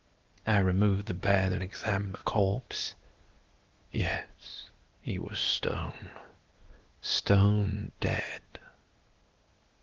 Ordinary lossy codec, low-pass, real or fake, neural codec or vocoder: Opus, 16 kbps; 7.2 kHz; fake; codec, 16 kHz, 0.8 kbps, ZipCodec